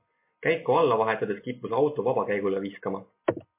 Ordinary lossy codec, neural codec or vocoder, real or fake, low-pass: MP3, 24 kbps; none; real; 3.6 kHz